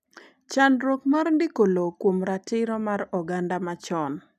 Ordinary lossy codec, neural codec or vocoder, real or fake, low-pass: none; none; real; 14.4 kHz